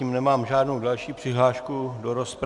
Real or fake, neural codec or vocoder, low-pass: real; none; 10.8 kHz